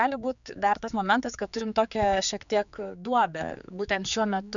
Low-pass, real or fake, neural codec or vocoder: 7.2 kHz; fake; codec, 16 kHz, 4 kbps, X-Codec, HuBERT features, trained on general audio